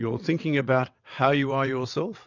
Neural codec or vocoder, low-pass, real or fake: vocoder, 22.05 kHz, 80 mel bands, WaveNeXt; 7.2 kHz; fake